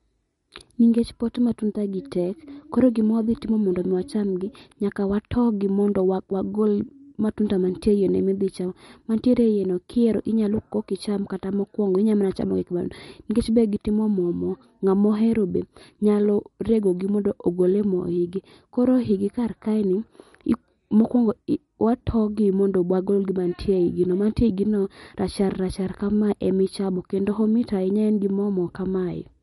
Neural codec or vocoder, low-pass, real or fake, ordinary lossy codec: none; 19.8 kHz; real; MP3, 48 kbps